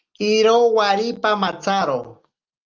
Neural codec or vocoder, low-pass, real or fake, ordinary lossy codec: none; 7.2 kHz; real; Opus, 24 kbps